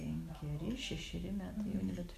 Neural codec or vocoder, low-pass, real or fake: none; 14.4 kHz; real